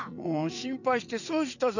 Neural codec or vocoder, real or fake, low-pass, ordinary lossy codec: vocoder, 44.1 kHz, 80 mel bands, Vocos; fake; 7.2 kHz; none